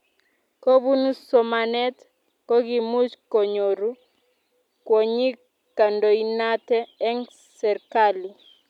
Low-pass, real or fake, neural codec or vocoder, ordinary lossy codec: 19.8 kHz; real; none; none